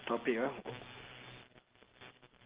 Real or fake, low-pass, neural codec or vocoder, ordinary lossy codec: real; 3.6 kHz; none; Opus, 16 kbps